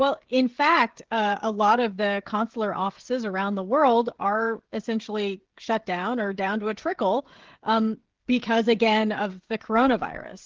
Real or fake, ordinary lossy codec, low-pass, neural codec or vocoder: fake; Opus, 16 kbps; 7.2 kHz; codec, 16 kHz, 16 kbps, FreqCodec, smaller model